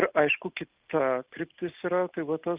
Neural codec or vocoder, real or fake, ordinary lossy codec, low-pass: none; real; Opus, 24 kbps; 3.6 kHz